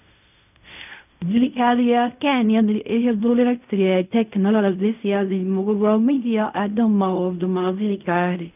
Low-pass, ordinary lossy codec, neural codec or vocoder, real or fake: 3.6 kHz; none; codec, 16 kHz in and 24 kHz out, 0.4 kbps, LongCat-Audio-Codec, fine tuned four codebook decoder; fake